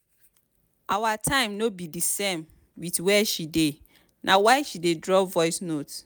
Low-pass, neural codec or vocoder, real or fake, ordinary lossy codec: none; none; real; none